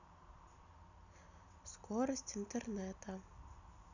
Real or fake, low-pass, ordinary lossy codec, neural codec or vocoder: real; 7.2 kHz; none; none